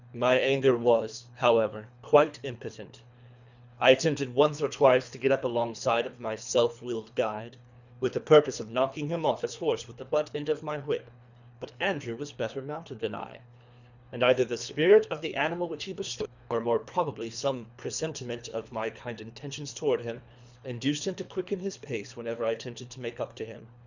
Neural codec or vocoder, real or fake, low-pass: codec, 24 kHz, 3 kbps, HILCodec; fake; 7.2 kHz